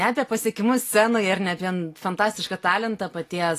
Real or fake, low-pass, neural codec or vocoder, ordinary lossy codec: real; 14.4 kHz; none; AAC, 48 kbps